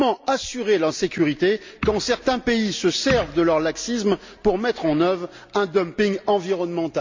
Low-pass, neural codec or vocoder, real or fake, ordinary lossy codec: 7.2 kHz; none; real; MP3, 64 kbps